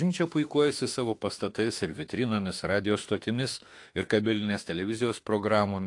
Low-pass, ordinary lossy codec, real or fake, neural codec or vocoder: 10.8 kHz; AAC, 48 kbps; fake; autoencoder, 48 kHz, 32 numbers a frame, DAC-VAE, trained on Japanese speech